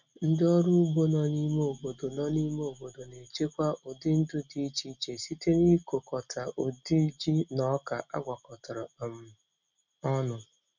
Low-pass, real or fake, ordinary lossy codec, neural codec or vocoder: 7.2 kHz; real; none; none